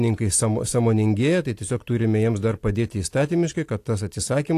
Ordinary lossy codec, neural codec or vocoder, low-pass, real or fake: AAC, 64 kbps; none; 14.4 kHz; real